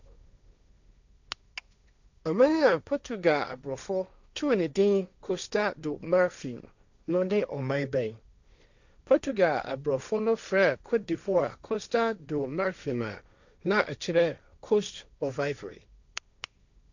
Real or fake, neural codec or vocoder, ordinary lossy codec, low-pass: fake; codec, 16 kHz, 1.1 kbps, Voila-Tokenizer; none; 7.2 kHz